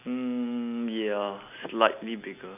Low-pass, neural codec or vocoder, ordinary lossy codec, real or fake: 3.6 kHz; none; MP3, 32 kbps; real